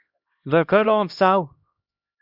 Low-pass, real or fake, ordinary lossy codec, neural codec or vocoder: 5.4 kHz; fake; Opus, 64 kbps; codec, 16 kHz, 1 kbps, X-Codec, HuBERT features, trained on LibriSpeech